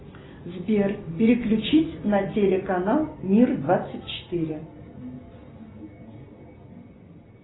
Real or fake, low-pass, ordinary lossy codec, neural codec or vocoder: real; 7.2 kHz; AAC, 16 kbps; none